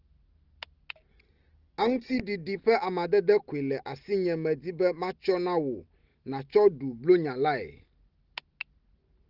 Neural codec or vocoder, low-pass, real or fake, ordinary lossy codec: none; 5.4 kHz; real; Opus, 16 kbps